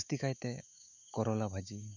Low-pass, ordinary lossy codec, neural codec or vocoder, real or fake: 7.2 kHz; none; autoencoder, 48 kHz, 128 numbers a frame, DAC-VAE, trained on Japanese speech; fake